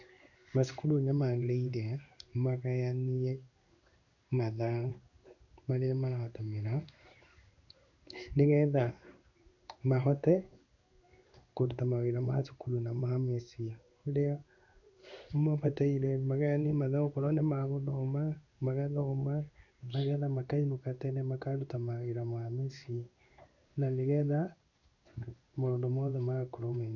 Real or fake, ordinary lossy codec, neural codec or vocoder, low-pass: fake; none; codec, 16 kHz in and 24 kHz out, 1 kbps, XY-Tokenizer; 7.2 kHz